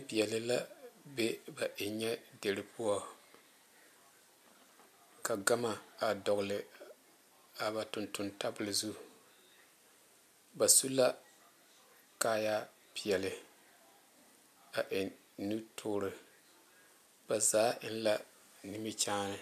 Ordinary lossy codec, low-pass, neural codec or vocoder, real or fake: MP3, 96 kbps; 14.4 kHz; none; real